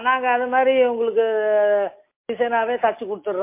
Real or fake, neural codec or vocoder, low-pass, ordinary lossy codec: real; none; 3.6 kHz; MP3, 24 kbps